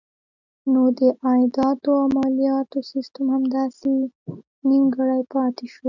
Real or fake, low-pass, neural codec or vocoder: real; 7.2 kHz; none